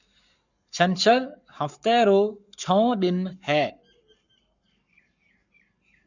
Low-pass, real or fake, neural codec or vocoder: 7.2 kHz; fake; codec, 44.1 kHz, 7.8 kbps, Pupu-Codec